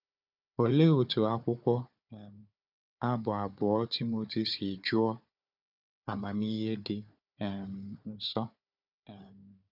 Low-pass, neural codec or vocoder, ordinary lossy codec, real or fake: 5.4 kHz; codec, 16 kHz, 4 kbps, FunCodec, trained on Chinese and English, 50 frames a second; none; fake